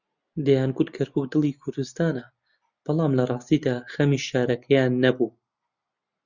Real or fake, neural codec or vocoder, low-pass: real; none; 7.2 kHz